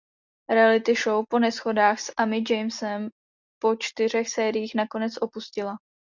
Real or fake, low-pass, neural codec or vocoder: real; 7.2 kHz; none